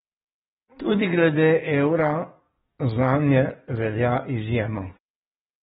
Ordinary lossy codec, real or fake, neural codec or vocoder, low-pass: AAC, 16 kbps; fake; codec, 44.1 kHz, 7.8 kbps, DAC; 19.8 kHz